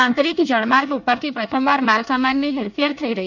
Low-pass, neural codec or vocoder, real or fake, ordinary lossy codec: 7.2 kHz; codec, 24 kHz, 1 kbps, SNAC; fake; none